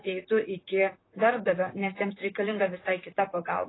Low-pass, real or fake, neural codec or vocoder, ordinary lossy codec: 7.2 kHz; fake; vocoder, 44.1 kHz, 128 mel bands, Pupu-Vocoder; AAC, 16 kbps